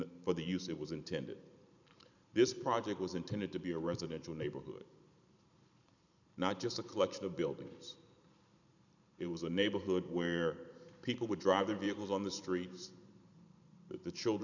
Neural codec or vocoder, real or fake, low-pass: vocoder, 44.1 kHz, 128 mel bands every 512 samples, BigVGAN v2; fake; 7.2 kHz